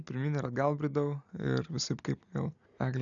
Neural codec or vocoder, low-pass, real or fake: none; 7.2 kHz; real